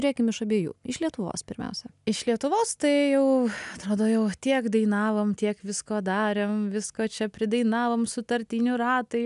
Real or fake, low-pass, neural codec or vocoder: real; 10.8 kHz; none